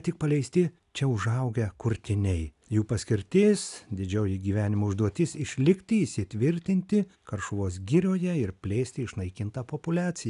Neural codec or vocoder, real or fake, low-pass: none; real; 10.8 kHz